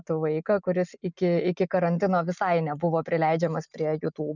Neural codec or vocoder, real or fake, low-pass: none; real; 7.2 kHz